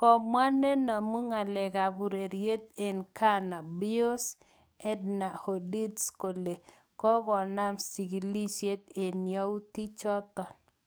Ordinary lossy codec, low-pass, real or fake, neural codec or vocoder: none; none; fake; codec, 44.1 kHz, 7.8 kbps, DAC